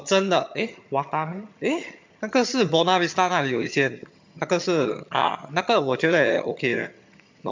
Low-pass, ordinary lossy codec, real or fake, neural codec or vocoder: 7.2 kHz; MP3, 64 kbps; fake; vocoder, 22.05 kHz, 80 mel bands, HiFi-GAN